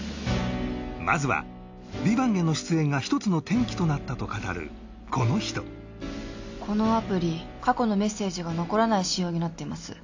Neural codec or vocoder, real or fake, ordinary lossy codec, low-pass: none; real; AAC, 48 kbps; 7.2 kHz